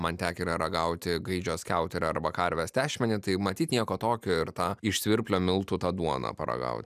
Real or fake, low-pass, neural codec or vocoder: real; 14.4 kHz; none